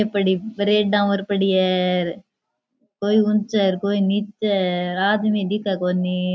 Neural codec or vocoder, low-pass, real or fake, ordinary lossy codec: none; none; real; none